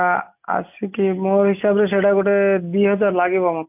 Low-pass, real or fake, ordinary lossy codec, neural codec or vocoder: 3.6 kHz; real; none; none